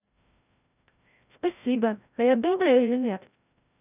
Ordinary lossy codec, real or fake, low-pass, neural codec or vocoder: none; fake; 3.6 kHz; codec, 16 kHz, 0.5 kbps, FreqCodec, larger model